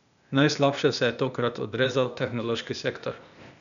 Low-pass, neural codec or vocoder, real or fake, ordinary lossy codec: 7.2 kHz; codec, 16 kHz, 0.8 kbps, ZipCodec; fake; none